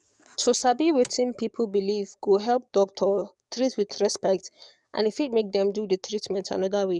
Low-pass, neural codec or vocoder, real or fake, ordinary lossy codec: 10.8 kHz; codec, 44.1 kHz, 7.8 kbps, DAC; fake; none